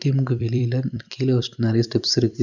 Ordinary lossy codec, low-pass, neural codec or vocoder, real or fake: none; 7.2 kHz; vocoder, 44.1 kHz, 128 mel bands every 256 samples, BigVGAN v2; fake